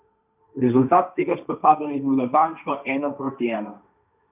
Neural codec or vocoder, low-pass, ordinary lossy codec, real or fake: codec, 16 kHz, 1.1 kbps, Voila-Tokenizer; 3.6 kHz; none; fake